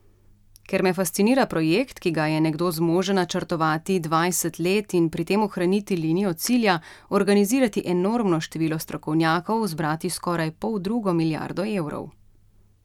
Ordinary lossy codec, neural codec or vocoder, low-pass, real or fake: none; none; 19.8 kHz; real